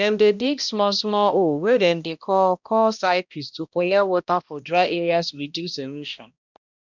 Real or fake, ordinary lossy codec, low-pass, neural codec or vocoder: fake; none; 7.2 kHz; codec, 16 kHz, 1 kbps, X-Codec, HuBERT features, trained on balanced general audio